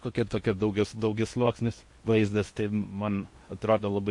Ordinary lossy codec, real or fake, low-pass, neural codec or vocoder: MP3, 48 kbps; fake; 10.8 kHz; codec, 16 kHz in and 24 kHz out, 0.8 kbps, FocalCodec, streaming, 65536 codes